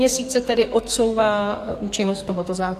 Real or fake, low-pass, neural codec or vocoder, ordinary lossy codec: fake; 14.4 kHz; codec, 44.1 kHz, 2.6 kbps, SNAC; AAC, 64 kbps